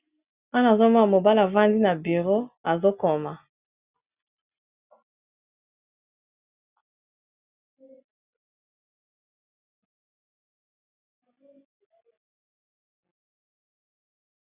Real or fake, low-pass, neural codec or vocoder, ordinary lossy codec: real; 3.6 kHz; none; Opus, 64 kbps